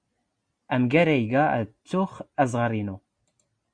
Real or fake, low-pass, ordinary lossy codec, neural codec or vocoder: real; 9.9 kHz; Opus, 64 kbps; none